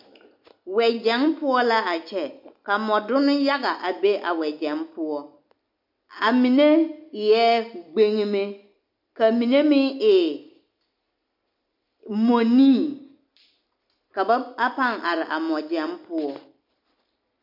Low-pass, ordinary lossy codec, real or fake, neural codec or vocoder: 5.4 kHz; MP3, 48 kbps; real; none